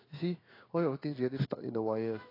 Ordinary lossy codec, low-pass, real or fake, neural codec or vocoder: AAC, 24 kbps; 5.4 kHz; fake; codec, 16 kHz in and 24 kHz out, 1 kbps, XY-Tokenizer